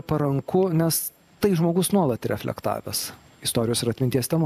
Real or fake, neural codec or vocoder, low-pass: fake; vocoder, 44.1 kHz, 128 mel bands every 512 samples, BigVGAN v2; 14.4 kHz